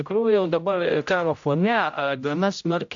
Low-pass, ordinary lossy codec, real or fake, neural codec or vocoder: 7.2 kHz; AAC, 64 kbps; fake; codec, 16 kHz, 0.5 kbps, X-Codec, HuBERT features, trained on general audio